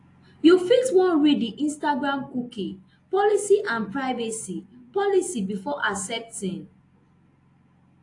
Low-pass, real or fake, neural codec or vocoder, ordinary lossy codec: 10.8 kHz; real; none; AAC, 48 kbps